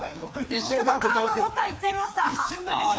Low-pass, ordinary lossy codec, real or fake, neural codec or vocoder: none; none; fake; codec, 16 kHz, 2 kbps, FreqCodec, larger model